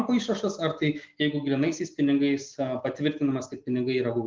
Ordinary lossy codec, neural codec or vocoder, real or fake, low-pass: Opus, 32 kbps; none; real; 7.2 kHz